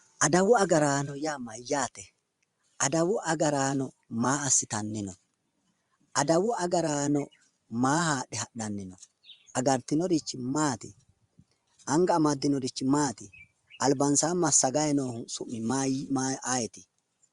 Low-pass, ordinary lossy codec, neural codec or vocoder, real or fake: 10.8 kHz; Opus, 64 kbps; vocoder, 24 kHz, 100 mel bands, Vocos; fake